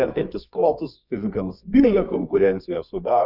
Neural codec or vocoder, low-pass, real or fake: codec, 24 kHz, 0.9 kbps, WavTokenizer, medium music audio release; 5.4 kHz; fake